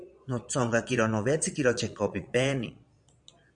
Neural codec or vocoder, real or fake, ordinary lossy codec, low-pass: vocoder, 22.05 kHz, 80 mel bands, Vocos; fake; MP3, 96 kbps; 9.9 kHz